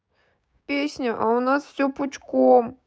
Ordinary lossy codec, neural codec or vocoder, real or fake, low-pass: none; codec, 16 kHz, 6 kbps, DAC; fake; none